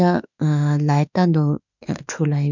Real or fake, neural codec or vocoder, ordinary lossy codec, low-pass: fake; autoencoder, 48 kHz, 32 numbers a frame, DAC-VAE, trained on Japanese speech; none; 7.2 kHz